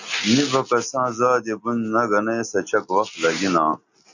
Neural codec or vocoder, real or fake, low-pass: none; real; 7.2 kHz